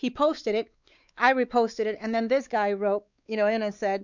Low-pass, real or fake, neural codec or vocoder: 7.2 kHz; fake; codec, 16 kHz, 4 kbps, X-Codec, WavLM features, trained on Multilingual LibriSpeech